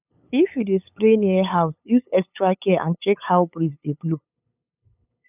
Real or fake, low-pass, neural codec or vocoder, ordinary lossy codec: fake; 3.6 kHz; codec, 16 kHz, 8 kbps, FunCodec, trained on LibriTTS, 25 frames a second; none